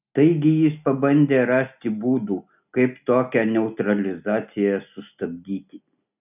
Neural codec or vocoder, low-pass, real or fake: none; 3.6 kHz; real